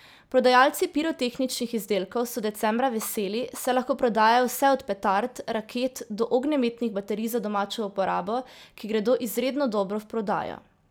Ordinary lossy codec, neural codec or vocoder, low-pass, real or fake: none; none; none; real